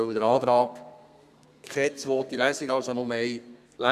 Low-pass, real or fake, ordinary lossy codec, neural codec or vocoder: 14.4 kHz; fake; Opus, 64 kbps; codec, 32 kHz, 1.9 kbps, SNAC